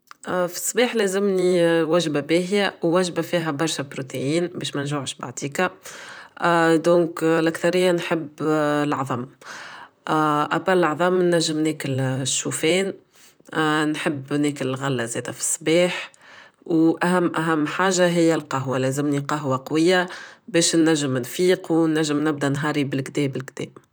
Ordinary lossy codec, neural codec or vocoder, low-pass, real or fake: none; vocoder, 44.1 kHz, 128 mel bands, Pupu-Vocoder; none; fake